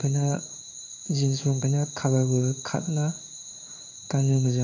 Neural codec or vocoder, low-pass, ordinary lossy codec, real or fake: codec, 16 kHz in and 24 kHz out, 1 kbps, XY-Tokenizer; 7.2 kHz; none; fake